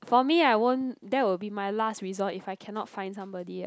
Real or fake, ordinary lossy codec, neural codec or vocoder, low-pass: real; none; none; none